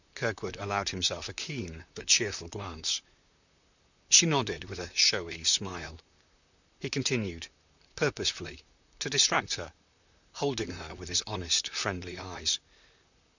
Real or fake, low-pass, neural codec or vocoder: fake; 7.2 kHz; vocoder, 44.1 kHz, 128 mel bands, Pupu-Vocoder